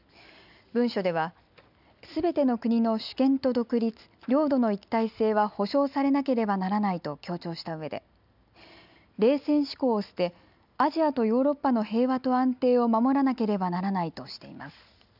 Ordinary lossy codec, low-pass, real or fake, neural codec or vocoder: none; 5.4 kHz; real; none